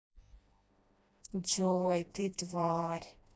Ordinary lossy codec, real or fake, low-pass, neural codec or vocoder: none; fake; none; codec, 16 kHz, 1 kbps, FreqCodec, smaller model